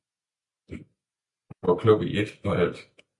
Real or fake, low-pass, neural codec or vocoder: real; 10.8 kHz; none